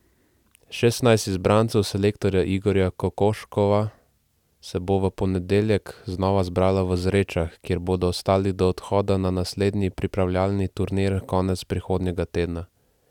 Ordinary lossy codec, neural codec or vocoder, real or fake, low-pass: none; none; real; 19.8 kHz